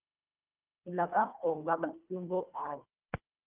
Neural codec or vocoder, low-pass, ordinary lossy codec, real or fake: codec, 24 kHz, 1.5 kbps, HILCodec; 3.6 kHz; Opus, 32 kbps; fake